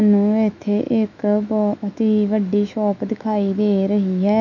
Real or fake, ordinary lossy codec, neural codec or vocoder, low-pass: fake; none; autoencoder, 48 kHz, 128 numbers a frame, DAC-VAE, trained on Japanese speech; 7.2 kHz